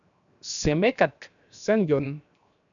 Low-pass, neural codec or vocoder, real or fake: 7.2 kHz; codec, 16 kHz, 0.7 kbps, FocalCodec; fake